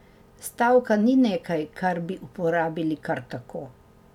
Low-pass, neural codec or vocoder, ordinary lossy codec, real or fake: 19.8 kHz; none; none; real